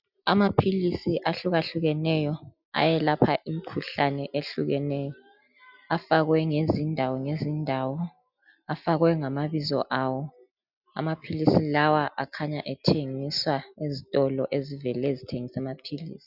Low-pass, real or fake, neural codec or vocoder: 5.4 kHz; real; none